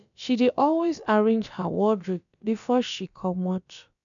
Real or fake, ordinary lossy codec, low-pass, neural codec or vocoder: fake; none; 7.2 kHz; codec, 16 kHz, about 1 kbps, DyCAST, with the encoder's durations